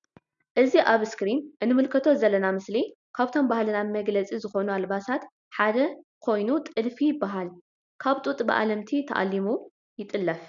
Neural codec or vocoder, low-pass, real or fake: none; 7.2 kHz; real